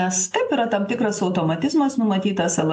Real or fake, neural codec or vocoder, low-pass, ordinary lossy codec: real; none; 9.9 kHz; AAC, 64 kbps